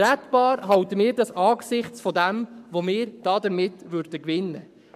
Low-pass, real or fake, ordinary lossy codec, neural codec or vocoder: 14.4 kHz; fake; none; codec, 44.1 kHz, 7.8 kbps, Pupu-Codec